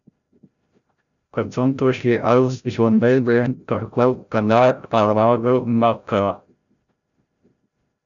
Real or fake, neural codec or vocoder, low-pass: fake; codec, 16 kHz, 0.5 kbps, FreqCodec, larger model; 7.2 kHz